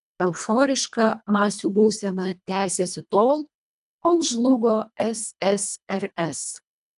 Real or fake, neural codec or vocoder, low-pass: fake; codec, 24 kHz, 1.5 kbps, HILCodec; 10.8 kHz